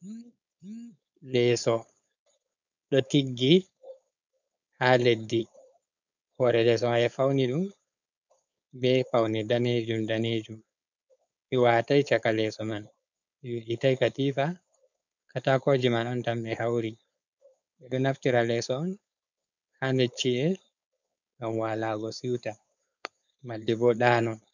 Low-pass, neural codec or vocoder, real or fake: 7.2 kHz; codec, 16 kHz, 4.8 kbps, FACodec; fake